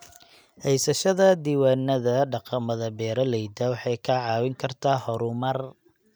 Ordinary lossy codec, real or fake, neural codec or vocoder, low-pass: none; real; none; none